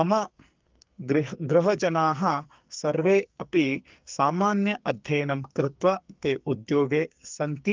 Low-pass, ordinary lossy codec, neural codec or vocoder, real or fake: 7.2 kHz; Opus, 32 kbps; codec, 44.1 kHz, 2.6 kbps, SNAC; fake